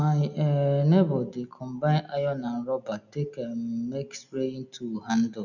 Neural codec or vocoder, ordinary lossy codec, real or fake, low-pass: none; none; real; 7.2 kHz